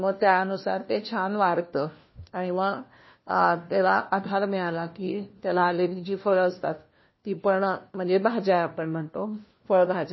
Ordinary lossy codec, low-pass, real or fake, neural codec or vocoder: MP3, 24 kbps; 7.2 kHz; fake; codec, 16 kHz, 1 kbps, FunCodec, trained on LibriTTS, 50 frames a second